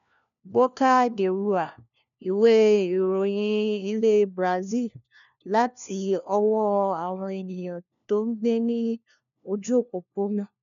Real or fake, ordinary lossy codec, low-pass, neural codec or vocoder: fake; none; 7.2 kHz; codec, 16 kHz, 1 kbps, FunCodec, trained on LibriTTS, 50 frames a second